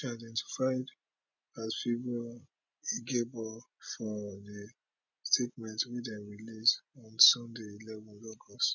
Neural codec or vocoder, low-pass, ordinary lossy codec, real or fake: none; 7.2 kHz; none; real